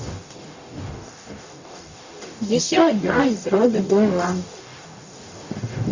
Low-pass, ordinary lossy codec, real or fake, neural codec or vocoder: 7.2 kHz; Opus, 64 kbps; fake; codec, 44.1 kHz, 0.9 kbps, DAC